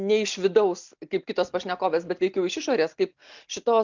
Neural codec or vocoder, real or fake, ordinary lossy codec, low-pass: none; real; MP3, 64 kbps; 7.2 kHz